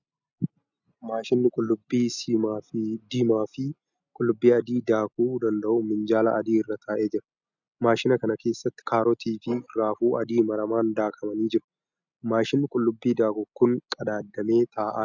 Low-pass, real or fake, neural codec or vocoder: 7.2 kHz; real; none